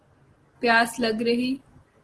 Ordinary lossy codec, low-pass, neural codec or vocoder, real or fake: Opus, 16 kbps; 10.8 kHz; none; real